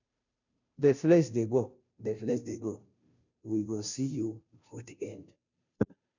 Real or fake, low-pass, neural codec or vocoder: fake; 7.2 kHz; codec, 16 kHz, 0.5 kbps, FunCodec, trained on Chinese and English, 25 frames a second